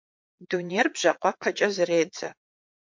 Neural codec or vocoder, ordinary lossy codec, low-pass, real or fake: vocoder, 22.05 kHz, 80 mel bands, Vocos; MP3, 48 kbps; 7.2 kHz; fake